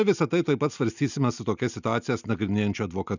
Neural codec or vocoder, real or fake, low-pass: none; real; 7.2 kHz